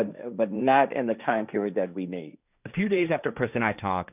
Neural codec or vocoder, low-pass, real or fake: codec, 16 kHz, 1.1 kbps, Voila-Tokenizer; 3.6 kHz; fake